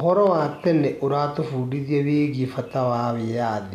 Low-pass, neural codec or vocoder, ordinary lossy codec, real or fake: 14.4 kHz; none; none; real